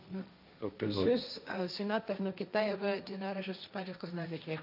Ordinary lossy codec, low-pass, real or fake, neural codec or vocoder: none; 5.4 kHz; fake; codec, 16 kHz, 1.1 kbps, Voila-Tokenizer